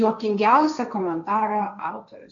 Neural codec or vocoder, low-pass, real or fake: codec, 16 kHz, 1.1 kbps, Voila-Tokenizer; 7.2 kHz; fake